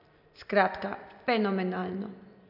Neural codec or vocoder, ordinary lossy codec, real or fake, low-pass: none; none; real; 5.4 kHz